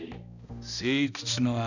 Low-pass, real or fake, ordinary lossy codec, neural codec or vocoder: 7.2 kHz; fake; none; codec, 16 kHz, 1 kbps, X-Codec, HuBERT features, trained on balanced general audio